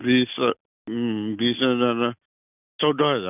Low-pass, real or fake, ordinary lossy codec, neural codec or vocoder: 3.6 kHz; real; none; none